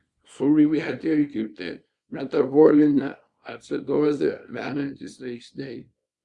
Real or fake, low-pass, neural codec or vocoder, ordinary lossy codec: fake; 10.8 kHz; codec, 24 kHz, 0.9 kbps, WavTokenizer, small release; Opus, 64 kbps